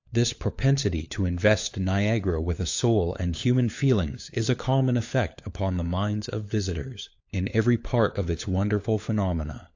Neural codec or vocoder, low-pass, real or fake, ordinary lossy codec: codec, 16 kHz, 4 kbps, FunCodec, trained on LibriTTS, 50 frames a second; 7.2 kHz; fake; AAC, 48 kbps